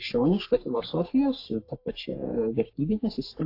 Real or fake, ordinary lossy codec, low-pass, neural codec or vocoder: fake; AAC, 32 kbps; 5.4 kHz; codec, 44.1 kHz, 3.4 kbps, Pupu-Codec